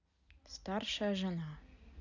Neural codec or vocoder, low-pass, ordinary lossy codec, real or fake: none; 7.2 kHz; none; real